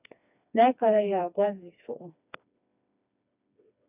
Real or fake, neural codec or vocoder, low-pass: fake; codec, 16 kHz, 2 kbps, FreqCodec, smaller model; 3.6 kHz